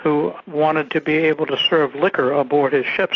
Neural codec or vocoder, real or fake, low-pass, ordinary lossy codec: none; real; 7.2 kHz; AAC, 32 kbps